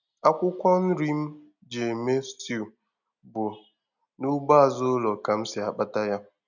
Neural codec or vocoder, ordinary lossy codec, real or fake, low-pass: none; none; real; 7.2 kHz